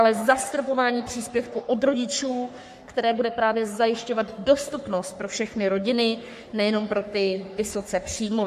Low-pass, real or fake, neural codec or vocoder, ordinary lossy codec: 14.4 kHz; fake; codec, 44.1 kHz, 3.4 kbps, Pupu-Codec; MP3, 64 kbps